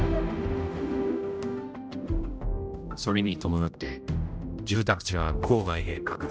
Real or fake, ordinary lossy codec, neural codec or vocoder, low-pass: fake; none; codec, 16 kHz, 0.5 kbps, X-Codec, HuBERT features, trained on general audio; none